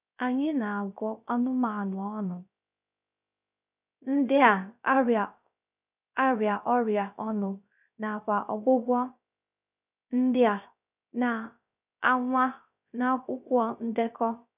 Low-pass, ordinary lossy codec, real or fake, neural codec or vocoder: 3.6 kHz; none; fake; codec, 16 kHz, 0.3 kbps, FocalCodec